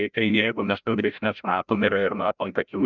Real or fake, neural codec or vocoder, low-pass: fake; codec, 16 kHz, 0.5 kbps, FreqCodec, larger model; 7.2 kHz